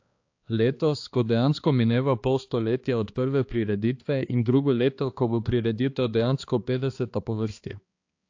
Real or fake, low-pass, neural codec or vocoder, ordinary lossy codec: fake; 7.2 kHz; codec, 16 kHz, 2 kbps, X-Codec, HuBERT features, trained on balanced general audio; AAC, 48 kbps